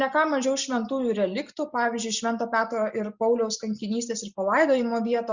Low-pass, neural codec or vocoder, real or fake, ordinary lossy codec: 7.2 kHz; none; real; Opus, 64 kbps